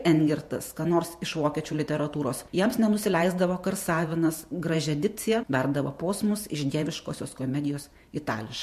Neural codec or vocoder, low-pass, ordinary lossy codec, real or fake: vocoder, 48 kHz, 128 mel bands, Vocos; 14.4 kHz; MP3, 64 kbps; fake